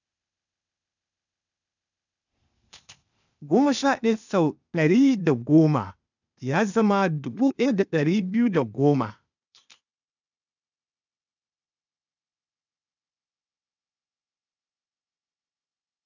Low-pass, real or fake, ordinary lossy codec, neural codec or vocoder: 7.2 kHz; fake; none; codec, 16 kHz, 0.8 kbps, ZipCodec